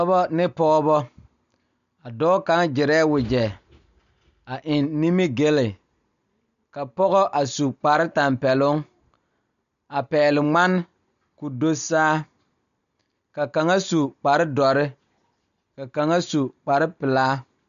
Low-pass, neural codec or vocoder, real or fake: 7.2 kHz; none; real